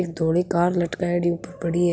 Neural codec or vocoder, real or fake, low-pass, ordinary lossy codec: none; real; none; none